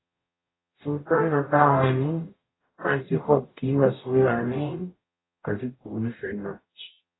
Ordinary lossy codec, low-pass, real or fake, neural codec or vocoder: AAC, 16 kbps; 7.2 kHz; fake; codec, 44.1 kHz, 0.9 kbps, DAC